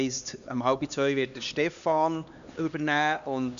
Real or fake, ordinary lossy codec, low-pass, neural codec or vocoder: fake; none; 7.2 kHz; codec, 16 kHz, 2 kbps, X-Codec, HuBERT features, trained on LibriSpeech